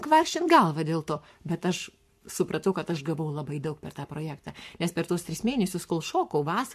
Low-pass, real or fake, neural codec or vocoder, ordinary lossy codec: 14.4 kHz; fake; codec, 44.1 kHz, 7.8 kbps, Pupu-Codec; MP3, 64 kbps